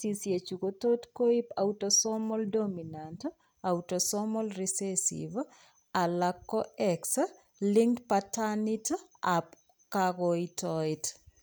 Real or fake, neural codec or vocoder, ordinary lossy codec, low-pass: real; none; none; none